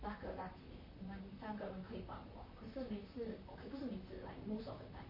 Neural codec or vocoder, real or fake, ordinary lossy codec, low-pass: vocoder, 44.1 kHz, 128 mel bands, Pupu-Vocoder; fake; MP3, 24 kbps; 5.4 kHz